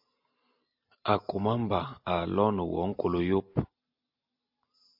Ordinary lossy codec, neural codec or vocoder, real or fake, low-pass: AAC, 48 kbps; none; real; 5.4 kHz